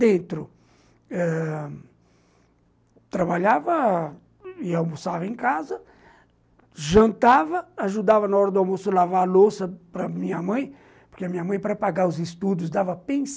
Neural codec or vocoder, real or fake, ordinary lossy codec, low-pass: none; real; none; none